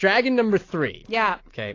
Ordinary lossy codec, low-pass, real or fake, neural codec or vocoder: AAC, 32 kbps; 7.2 kHz; real; none